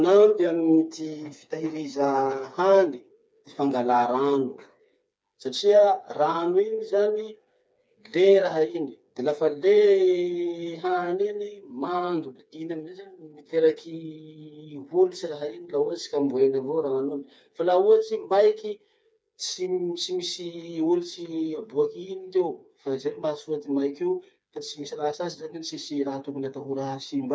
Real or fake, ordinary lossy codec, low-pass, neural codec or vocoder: fake; none; none; codec, 16 kHz, 4 kbps, FreqCodec, smaller model